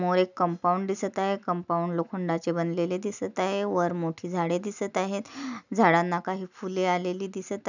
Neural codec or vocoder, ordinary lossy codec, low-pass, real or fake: none; none; 7.2 kHz; real